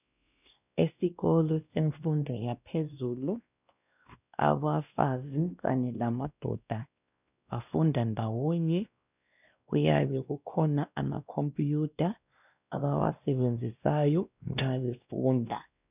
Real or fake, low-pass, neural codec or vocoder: fake; 3.6 kHz; codec, 16 kHz, 1 kbps, X-Codec, WavLM features, trained on Multilingual LibriSpeech